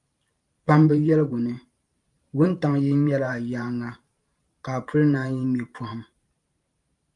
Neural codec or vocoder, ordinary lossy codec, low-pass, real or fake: vocoder, 44.1 kHz, 128 mel bands every 512 samples, BigVGAN v2; Opus, 32 kbps; 10.8 kHz; fake